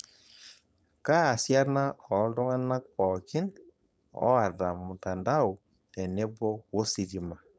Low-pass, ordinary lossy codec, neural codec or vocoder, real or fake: none; none; codec, 16 kHz, 4.8 kbps, FACodec; fake